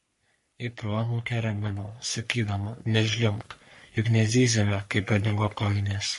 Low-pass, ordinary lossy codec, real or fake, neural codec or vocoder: 14.4 kHz; MP3, 48 kbps; fake; codec, 44.1 kHz, 3.4 kbps, Pupu-Codec